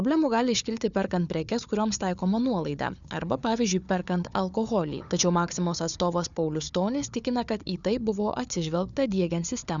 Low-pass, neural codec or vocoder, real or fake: 7.2 kHz; codec, 16 kHz, 4 kbps, FunCodec, trained on Chinese and English, 50 frames a second; fake